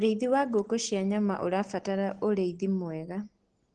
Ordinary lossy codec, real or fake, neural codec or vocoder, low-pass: Opus, 16 kbps; real; none; 9.9 kHz